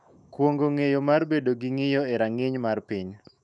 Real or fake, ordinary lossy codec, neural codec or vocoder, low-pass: fake; none; autoencoder, 48 kHz, 128 numbers a frame, DAC-VAE, trained on Japanese speech; 10.8 kHz